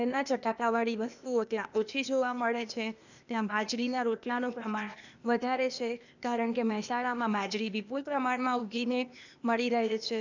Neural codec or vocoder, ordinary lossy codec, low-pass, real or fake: codec, 16 kHz, 0.8 kbps, ZipCodec; none; 7.2 kHz; fake